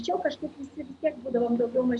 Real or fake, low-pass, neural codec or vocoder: real; 10.8 kHz; none